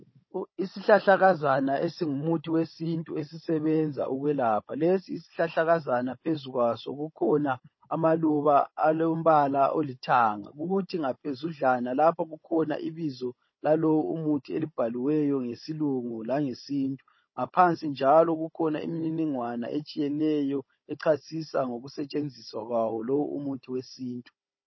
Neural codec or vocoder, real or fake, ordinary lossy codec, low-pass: codec, 16 kHz, 16 kbps, FunCodec, trained on Chinese and English, 50 frames a second; fake; MP3, 24 kbps; 7.2 kHz